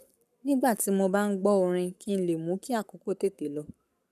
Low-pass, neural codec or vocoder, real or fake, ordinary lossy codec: 14.4 kHz; codec, 44.1 kHz, 7.8 kbps, Pupu-Codec; fake; none